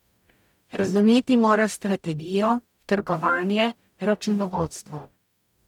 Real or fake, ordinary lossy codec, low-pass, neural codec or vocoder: fake; none; 19.8 kHz; codec, 44.1 kHz, 0.9 kbps, DAC